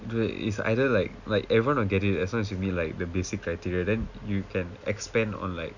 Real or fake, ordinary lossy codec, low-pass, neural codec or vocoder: real; none; 7.2 kHz; none